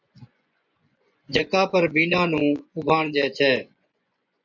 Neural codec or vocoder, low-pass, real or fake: vocoder, 24 kHz, 100 mel bands, Vocos; 7.2 kHz; fake